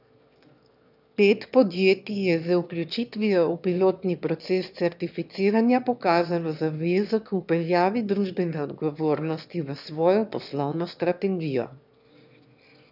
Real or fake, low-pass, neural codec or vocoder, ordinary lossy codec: fake; 5.4 kHz; autoencoder, 22.05 kHz, a latent of 192 numbers a frame, VITS, trained on one speaker; none